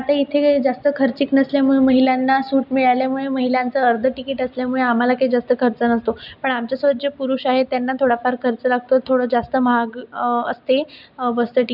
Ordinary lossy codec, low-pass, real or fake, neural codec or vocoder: none; 5.4 kHz; real; none